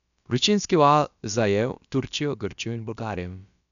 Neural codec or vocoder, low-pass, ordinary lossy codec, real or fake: codec, 16 kHz, about 1 kbps, DyCAST, with the encoder's durations; 7.2 kHz; none; fake